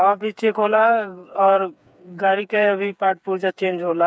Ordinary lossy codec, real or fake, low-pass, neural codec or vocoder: none; fake; none; codec, 16 kHz, 4 kbps, FreqCodec, smaller model